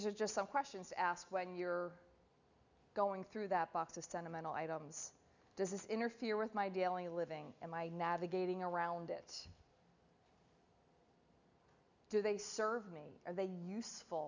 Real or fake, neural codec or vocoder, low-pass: real; none; 7.2 kHz